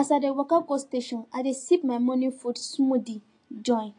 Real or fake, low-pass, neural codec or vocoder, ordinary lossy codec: real; 9.9 kHz; none; AAC, 48 kbps